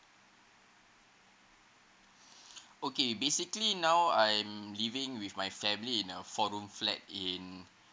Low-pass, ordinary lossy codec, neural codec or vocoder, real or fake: none; none; none; real